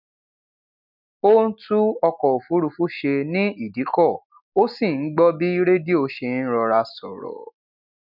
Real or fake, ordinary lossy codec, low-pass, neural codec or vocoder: real; none; 5.4 kHz; none